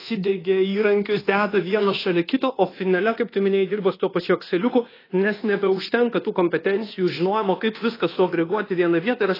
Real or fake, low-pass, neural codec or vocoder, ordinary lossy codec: fake; 5.4 kHz; codec, 24 kHz, 1.2 kbps, DualCodec; AAC, 24 kbps